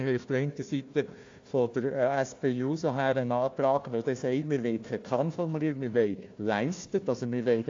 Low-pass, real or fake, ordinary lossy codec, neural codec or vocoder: 7.2 kHz; fake; AAC, 48 kbps; codec, 16 kHz, 1 kbps, FunCodec, trained on Chinese and English, 50 frames a second